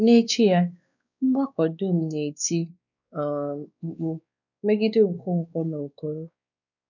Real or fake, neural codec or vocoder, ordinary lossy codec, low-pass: fake; codec, 16 kHz, 2 kbps, X-Codec, WavLM features, trained on Multilingual LibriSpeech; none; 7.2 kHz